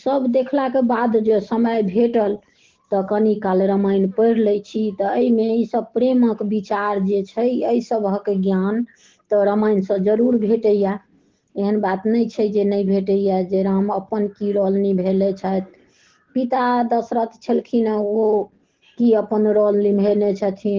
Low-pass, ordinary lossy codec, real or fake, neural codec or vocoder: 7.2 kHz; Opus, 16 kbps; real; none